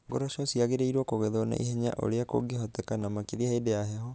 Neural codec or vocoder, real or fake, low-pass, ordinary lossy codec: none; real; none; none